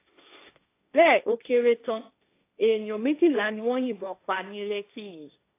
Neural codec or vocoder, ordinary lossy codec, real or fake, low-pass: codec, 16 kHz, 1.1 kbps, Voila-Tokenizer; AAC, 24 kbps; fake; 3.6 kHz